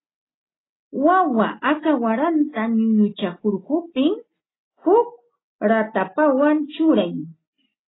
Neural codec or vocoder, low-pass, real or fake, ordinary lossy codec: none; 7.2 kHz; real; AAC, 16 kbps